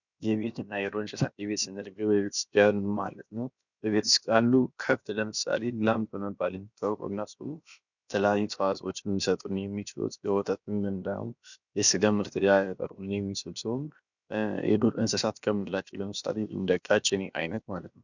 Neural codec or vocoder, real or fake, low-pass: codec, 16 kHz, about 1 kbps, DyCAST, with the encoder's durations; fake; 7.2 kHz